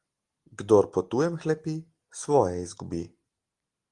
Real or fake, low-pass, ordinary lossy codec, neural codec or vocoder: real; 10.8 kHz; Opus, 24 kbps; none